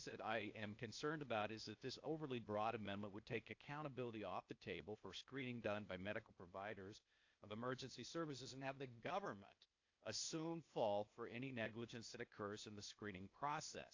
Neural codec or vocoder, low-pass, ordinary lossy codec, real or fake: codec, 16 kHz, 0.8 kbps, ZipCodec; 7.2 kHz; AAC, 48 kbps; fake